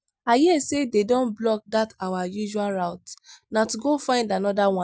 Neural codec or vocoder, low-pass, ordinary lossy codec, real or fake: none; none; none; real